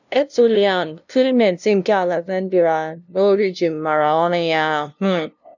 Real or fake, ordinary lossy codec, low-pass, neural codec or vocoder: fake; none; 7.2 kHz; codec, 16 kHz, 0.5 kbps, FunCodec, trained on LibriTTS, 25 frames a second